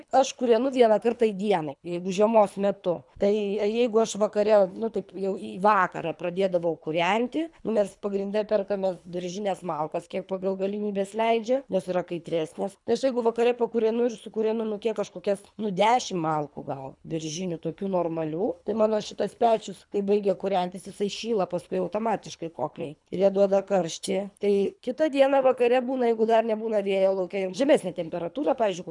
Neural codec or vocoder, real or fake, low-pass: codec, 24 kHz, 3 kbps, HILCodec; fake; 10.8 kHz